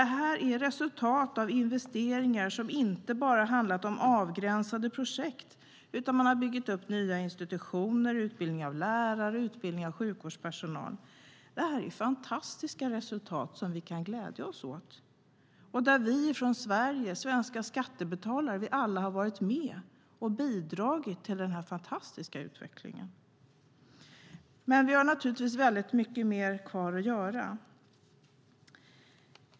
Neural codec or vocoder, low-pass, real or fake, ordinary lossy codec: none; none; real; none